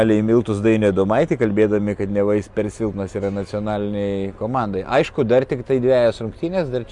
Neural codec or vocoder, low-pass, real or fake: none; 10.8 kHz; real